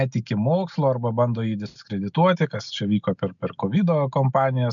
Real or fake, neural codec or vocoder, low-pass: real; none; 7.2 kHz